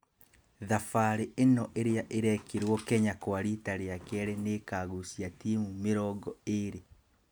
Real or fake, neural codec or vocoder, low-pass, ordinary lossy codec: real; none; none; none